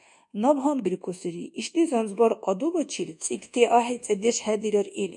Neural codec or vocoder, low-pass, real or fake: codec, 24 kHz, 1.2 kbps, DualCodec; 10.8 kHz; fake